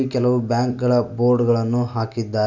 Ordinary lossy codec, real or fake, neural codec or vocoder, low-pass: none; real; none; 7.2 kHz